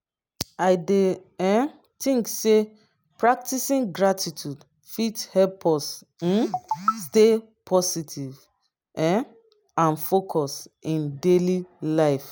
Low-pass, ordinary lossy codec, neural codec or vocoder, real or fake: none; none; none; real